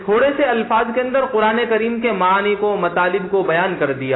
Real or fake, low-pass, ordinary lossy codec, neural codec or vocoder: real; 7.2 kHz; AAC, 16 kbps; none